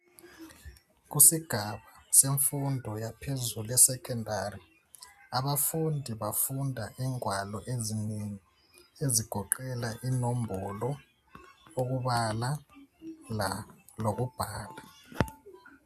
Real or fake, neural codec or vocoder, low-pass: real; none; 14.4 kHz